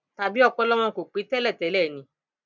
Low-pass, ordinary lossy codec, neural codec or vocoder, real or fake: 7.2 kHz; none; none; real